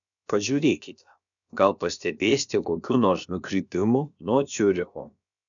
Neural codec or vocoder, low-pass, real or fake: codec, 16 kHz, about 1 kbps, DyCAST, with the encoder's durations; 7.2 kHz; fake